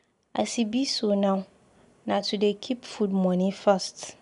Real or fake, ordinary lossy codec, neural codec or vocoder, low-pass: real; none; none; 10.8 kHz